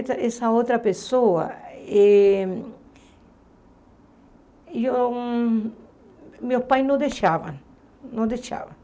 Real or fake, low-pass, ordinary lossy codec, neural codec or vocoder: real; none; none; none